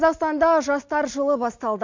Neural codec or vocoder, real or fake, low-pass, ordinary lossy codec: none; real; 7.2 kHz; MP3, 48 kbps